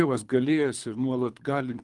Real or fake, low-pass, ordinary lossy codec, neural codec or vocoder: fake; 10.8 kHz; Opus, 32 kbps; codec, 24 kHz, 3 kbps, HILCodec